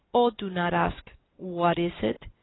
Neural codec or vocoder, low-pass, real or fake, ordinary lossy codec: none; 7.2 kHz; real; AAC, 16 kbps